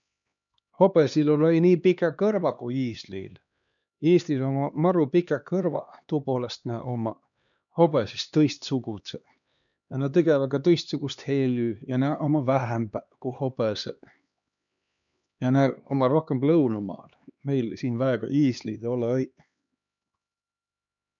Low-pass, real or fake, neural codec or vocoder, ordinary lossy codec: 7.2 kHz; fake; codec, 16 kHz, 2 kbps, X-Codec, HuBERT features, trained on LibriSpeech; none